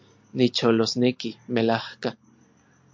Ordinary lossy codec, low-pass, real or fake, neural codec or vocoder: MP3, 48 kbps; 7.2 kHz; real; none